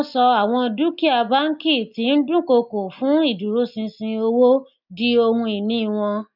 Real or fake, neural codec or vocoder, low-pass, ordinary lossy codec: real; none; 5.4 kHz; none